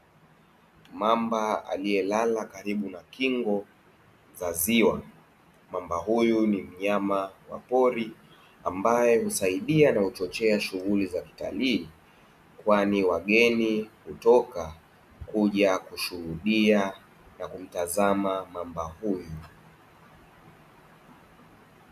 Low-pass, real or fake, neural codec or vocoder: 14.4 kHz; real; none